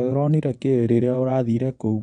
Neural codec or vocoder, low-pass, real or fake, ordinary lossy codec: vocoder, 22.05 kHz, 80 mel bands, WaveNeXt; 9.9 kHz; fake; none